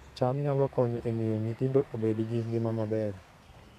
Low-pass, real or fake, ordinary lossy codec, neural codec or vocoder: 14.4 kHz; fake; none; codec, 32 kHz, 1.9 kbps, SNAC